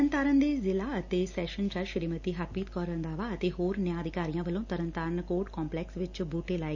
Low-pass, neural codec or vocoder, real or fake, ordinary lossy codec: 7.2 kHz; none; real; none